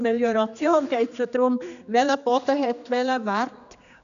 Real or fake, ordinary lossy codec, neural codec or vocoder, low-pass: fake; none; codec, 16 kHz, 2 kbps, X-Codec, HuBERT features, trained on general audio; 7.2 kHz